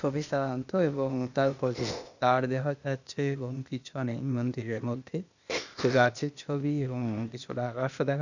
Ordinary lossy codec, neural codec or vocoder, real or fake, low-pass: none; codec, 16 kHz, 0.8 kbps, ZipCodec; fake; 7.2 kHz